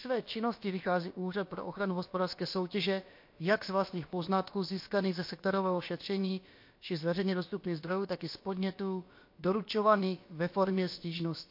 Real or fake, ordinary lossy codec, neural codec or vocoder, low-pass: fake; MP3, 32 kbps; codec, 16 kHz, about 1 kbps, DyCAST, with the encoder's durations; 5.4 kHz